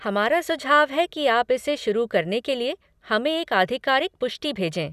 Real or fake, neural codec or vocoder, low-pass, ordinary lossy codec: real; none; 14.4 kHz; none